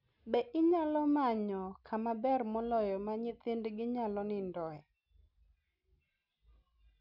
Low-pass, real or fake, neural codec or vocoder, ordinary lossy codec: 5.4 kHz; real; none; none